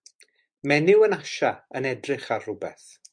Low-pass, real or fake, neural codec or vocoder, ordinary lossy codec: 9.9 kHz; real; none; MP3, 64 kbps